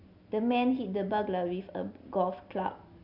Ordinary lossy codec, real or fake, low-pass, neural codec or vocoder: none; real; 5.4 kHz; none